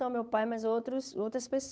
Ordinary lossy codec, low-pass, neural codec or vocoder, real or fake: none; none; codec, 16 kHz, 8 kbps, FunCodec, trained on Chinese and English, 25 frames a second; fake